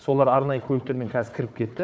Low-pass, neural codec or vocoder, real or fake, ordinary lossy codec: none; codec, 16 kHz, 4 kbps, FunCodec, trained on Chinese and English, 50 frames a second; fake; none